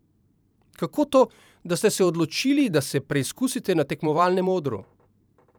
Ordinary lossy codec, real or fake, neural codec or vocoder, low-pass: none; fake; vocoder, 44.1 kHz, 128 mel bands every 512 samples, BigVGAN v2; none